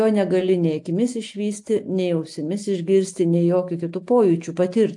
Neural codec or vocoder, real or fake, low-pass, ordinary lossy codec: none; real; 10.8 kHz; AAC, 64 kbps